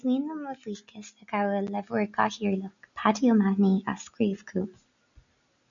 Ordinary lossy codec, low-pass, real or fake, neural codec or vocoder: MP3, 96 kbps; 7.2 kHz; real; none